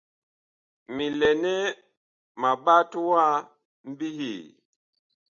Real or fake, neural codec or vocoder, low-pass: real; none; 7.2 kHz